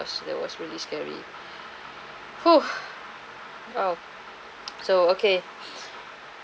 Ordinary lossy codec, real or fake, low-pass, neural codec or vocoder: none; real; none; none